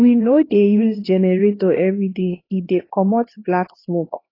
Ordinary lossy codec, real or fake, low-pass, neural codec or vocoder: AAC, 24 kbps; fake; 5.4 kHz; codec, 16 kHz, 2 kbps, X-Codec, HuBERT features, trained on LibriSpeech